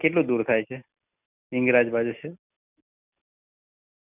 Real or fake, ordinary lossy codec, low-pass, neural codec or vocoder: real; none; 3.6 kHz; none